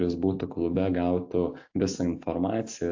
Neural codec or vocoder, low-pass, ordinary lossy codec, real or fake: none; 7.2 kHz; AAC, 48 kbps; real